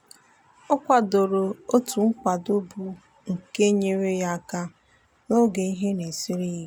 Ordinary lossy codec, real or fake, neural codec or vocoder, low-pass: none; real; none; none